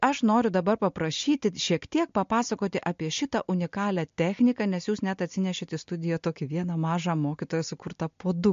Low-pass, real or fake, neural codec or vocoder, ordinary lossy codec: 7.2 kHz; real; none; MP3, 48 kbps